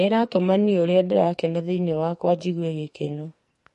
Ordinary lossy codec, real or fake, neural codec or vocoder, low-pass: MP3, 48 kbps; fake; codec, 44.1 kHz, 2.6 kbps, SNAC; 14.4 kHz